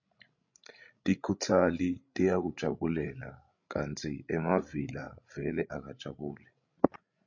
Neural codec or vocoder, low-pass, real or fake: codec, 16 kHz, 16 kbps, FreqCodec, larger model; 7.2 kHz; fake